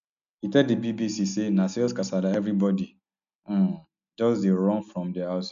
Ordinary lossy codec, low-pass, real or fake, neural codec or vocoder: none; 7.2 kHz; real; none